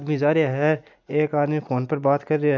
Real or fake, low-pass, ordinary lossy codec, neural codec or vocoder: real; 7.2 kHz; none; none